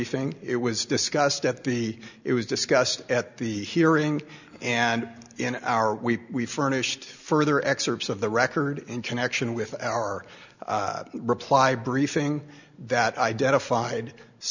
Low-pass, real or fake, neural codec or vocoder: 7.2 kHz; real; none